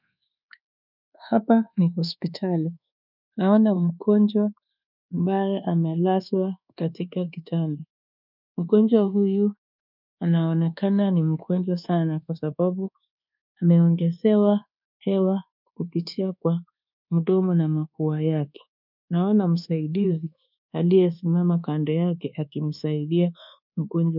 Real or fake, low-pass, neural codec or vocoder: fake; 5.4 kHz; codec, 24 kHz, 1.2 kbps, DualCodec